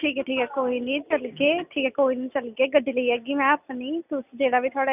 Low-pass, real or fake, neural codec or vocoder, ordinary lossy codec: 3.6 kHz; real; none; none